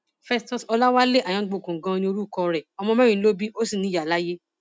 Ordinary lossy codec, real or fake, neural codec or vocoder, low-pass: none; real; none; none